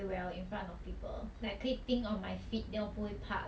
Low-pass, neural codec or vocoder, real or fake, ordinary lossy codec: none; none; real; none